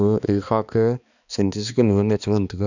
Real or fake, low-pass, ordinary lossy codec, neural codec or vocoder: fake; 7.2 kHz; none; codec, 16 kHz, 2 kbps, X-Codec, HuBERT features, trained on balanced general audio